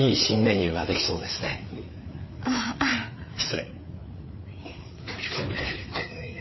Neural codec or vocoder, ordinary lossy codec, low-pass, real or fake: codec, 16 kHz, 4 kbps, FreqCodec, larger model; MP3, 24 kbps; 7.2 kHz; fake